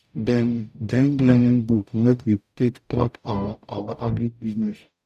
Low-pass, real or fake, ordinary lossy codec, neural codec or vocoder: 14.4 kHz; fake; none; codec, 44.1 kHz, 0.9 kbps, DAC